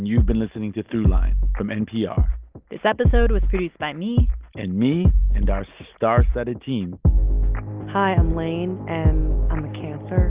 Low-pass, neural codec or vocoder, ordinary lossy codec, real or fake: 3.6 kHz; none; Opus, 32 kbps; real